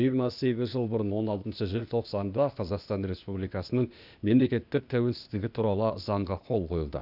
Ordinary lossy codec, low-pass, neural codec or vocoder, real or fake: none; 5.4 kHz; codec, 16 kHz, 0.8 kbps, ZipCodec; fake